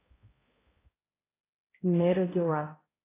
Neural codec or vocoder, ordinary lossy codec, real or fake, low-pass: codec, 16 kHz, 0.5 kbps, X-Codec, HuBERT features, trained on balanced general audio; AAC, 16 kbps; fake; 3.6 kHz